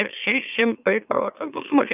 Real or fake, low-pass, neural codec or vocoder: fake; 3.6 kHz; autoencoder, 44.1 kHz, a latent of 192 numbers a frame, MeloTTS